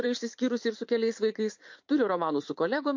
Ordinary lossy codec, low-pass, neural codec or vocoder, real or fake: MP3, 48 kbps; 7.2 kHz; vocoder, 44.1 kHz, 80 mel bands, Vocos; fake